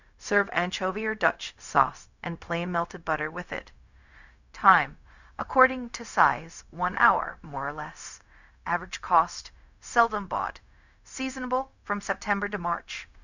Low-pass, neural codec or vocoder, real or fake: 7.2 kHz; codec, 16 kHz, 0.4 kbps, LongCat-Audio-Codec; fake